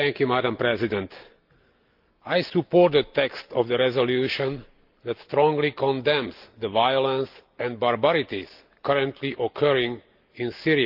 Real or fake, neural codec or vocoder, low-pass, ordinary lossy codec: real; none; 5.4 kHz; Opus, 24 kbps